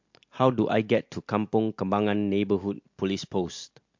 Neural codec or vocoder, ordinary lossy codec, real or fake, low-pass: none; MP3, 48 kbps; real; 7.2 kHz